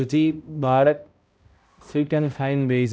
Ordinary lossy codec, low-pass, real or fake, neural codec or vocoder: none; none; fake; codec, 16 kHz, 0.5 kbps, X-Codec, HuBERT features, trained on balanced general audio